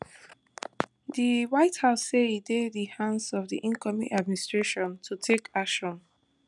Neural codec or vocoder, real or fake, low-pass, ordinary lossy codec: none; real; 10.8 kHz; none